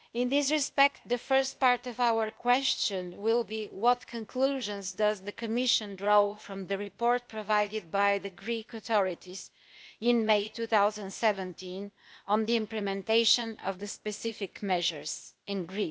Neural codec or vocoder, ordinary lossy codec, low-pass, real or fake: codec, 16 kHz, 0.8 kbps, ZipCodec; none; none; fake